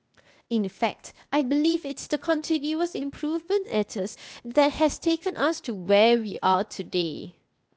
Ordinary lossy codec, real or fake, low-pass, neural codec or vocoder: none; fake; none; codec, 16 kHz, 0.8 kbps, ZipCodec